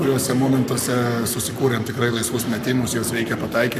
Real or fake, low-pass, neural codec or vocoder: fake; 14.4 kHz; codec, 44.1 kHz, 7.8 kbps, Pupu-Codec